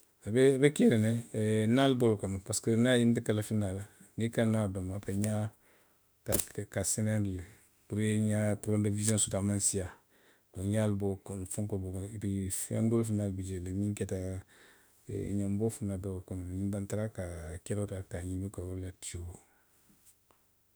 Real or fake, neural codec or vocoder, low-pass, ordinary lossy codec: fake; autoencoder, 48 kHz, 32 numbers a frame, DAC-VAE, trained on Japanese speech; none; none